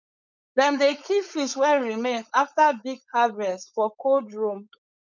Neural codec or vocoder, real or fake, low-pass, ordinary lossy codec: codec, 16 kHz, 4.8 kbps, FACodec; fake; 7.2 kHz; none